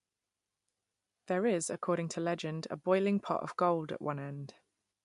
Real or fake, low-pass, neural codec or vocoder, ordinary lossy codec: real; 10.8 kHz; none; MP3, 64 kbps